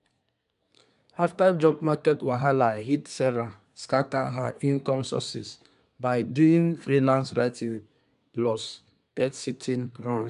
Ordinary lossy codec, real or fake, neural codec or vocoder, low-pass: none; fake; codec, 24 kHz, 1 kbps, SNAC; 10.8 kHz